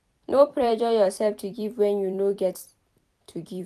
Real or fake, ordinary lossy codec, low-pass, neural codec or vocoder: fake; none; 14.4 kHz; vocoder, 44.1 kHz, 128 mel bands every 256 samples, BigVGAN v2